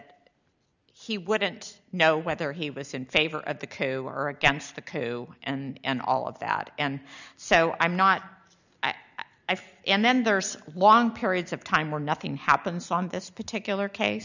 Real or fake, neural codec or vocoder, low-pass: real; none; 7.2 kHz